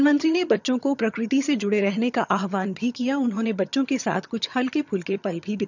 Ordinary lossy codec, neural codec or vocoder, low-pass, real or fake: none; vocoder, 22.05 kHz, 80 mel bands, HiFi-GAN; 7.2 kHz; fake